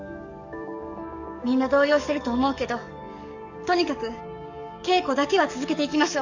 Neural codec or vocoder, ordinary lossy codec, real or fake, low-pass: codec, 44.1 kHz, 7.8 kbps, DAC; Opus, 64 kbps; fake; 7.2 kHz